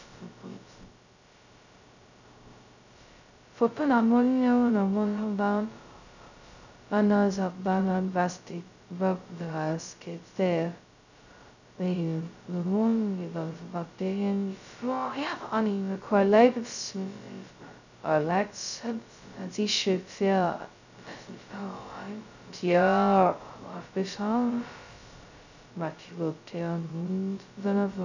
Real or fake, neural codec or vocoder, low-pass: fake; codec, 16 kHz, 0.2 kbps, FocalCodec; 7.2 kHz